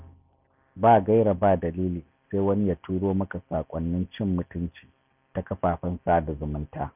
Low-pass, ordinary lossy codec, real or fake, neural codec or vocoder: 3.6 kHz; none; real; none